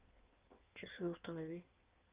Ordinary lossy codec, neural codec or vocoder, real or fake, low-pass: Opus, 32 kbps; codec, 16 kHz in and 24 kHz out, 1.1 kbps, FireRedTTS-2 codec; fake; 3.6 kHz